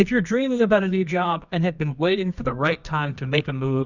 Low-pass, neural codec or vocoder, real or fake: 7.2 kHz; codec, 24 kHz, 0.9 kbps, WavTokenizer, medium music audio release; fake